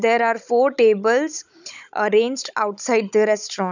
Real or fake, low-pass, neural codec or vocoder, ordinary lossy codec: fake; 7.2 kHz; codec, 16 kHz, 16 kbps, FunCodec, trained on Chinese and English, 50 frames a second; none